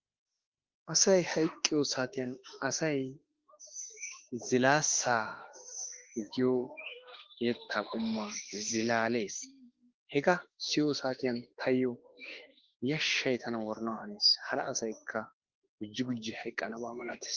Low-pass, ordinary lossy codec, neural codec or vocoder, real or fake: 7.2 kHz; Opus, 16 kbps; autoencoder, 48 kHz, 32 numbers a frame, DAC-VAE, trained on Japanese speech; fake